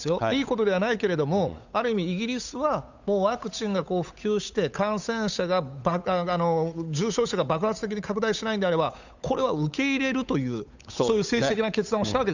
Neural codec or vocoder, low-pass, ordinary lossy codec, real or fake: codec, 16 kHz, 8 kbps, FunCodec, trained on Chinese and English, 25 frames a second; 7.2 kHz; none; fake